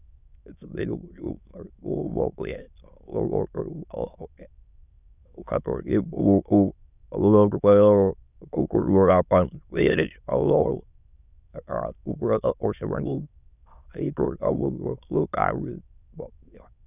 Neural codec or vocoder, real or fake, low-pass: autoencoder, 22.05 kHz, a latent of 192 numbers a frame, VITS, trained on many speakers; fake; 3.6 kHz